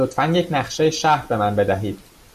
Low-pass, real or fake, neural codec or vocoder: 14.4 kHz; real; none